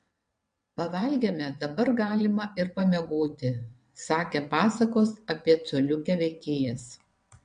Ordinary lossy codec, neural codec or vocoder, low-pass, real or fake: MP3, 64 kbps; vocoder, 22.05 kHz, 80 mel bands, WaveNeXt; 9.9 kHz; fake